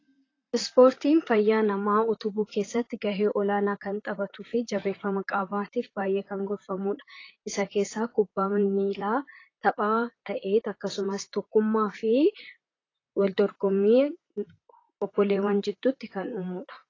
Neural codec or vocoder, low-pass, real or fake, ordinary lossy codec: vocoder, 44.1 kHz, 128 mel bands, Pupu-Vocoder; 7.2 kHz; fake; AAC, 32 kbps